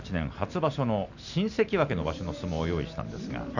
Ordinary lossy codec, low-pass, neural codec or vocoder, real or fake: none; 7.2 kHz; none; real